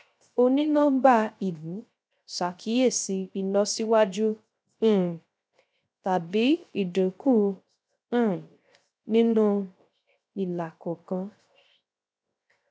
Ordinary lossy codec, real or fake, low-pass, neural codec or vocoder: none; fake; none; codec, 16 kHz, 0.3 kbps, FocalCodec